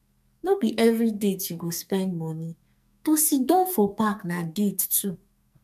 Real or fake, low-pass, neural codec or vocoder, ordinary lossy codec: fake; 14.4 kHz; codec, 44.1 kHz, 2.6 kbps, SNAC; none